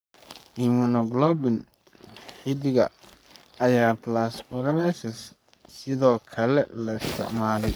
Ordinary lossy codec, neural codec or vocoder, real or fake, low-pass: none; codec, 44.1 kHz, 3.4 kbps, Pupu-Codec; fake; none